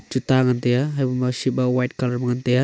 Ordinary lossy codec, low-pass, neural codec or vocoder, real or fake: none; none; none; real